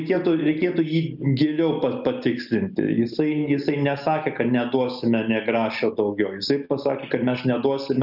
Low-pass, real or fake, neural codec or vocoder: 5.4 kHz; real; none